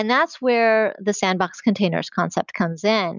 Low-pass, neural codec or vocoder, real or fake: 7.2 kHz; none; real